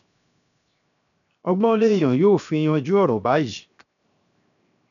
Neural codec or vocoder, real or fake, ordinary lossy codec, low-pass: codec, 16 kHz, 0.7 kbps, FocalCodec; fake; none; 7.2 kHz